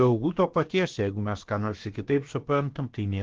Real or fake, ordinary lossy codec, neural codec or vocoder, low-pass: fake; Opus, 16 kbps; codec, 16 kHz, about 1 kbps, DyCAST, with the encoder's durations; 7.2 kHz